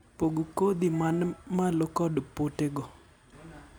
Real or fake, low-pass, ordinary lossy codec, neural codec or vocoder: real; none; none; none